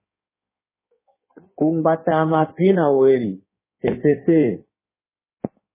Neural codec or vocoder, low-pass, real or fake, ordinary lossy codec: codec, 16 kHz in and 24 kHz out, 1.1 kbps, FireRedTTS-2 codec; 3.6 kHz; fake; MP3, 16 kbps